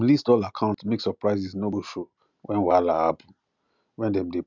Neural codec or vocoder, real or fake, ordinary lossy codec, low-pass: none; real; none; 7.2 kHz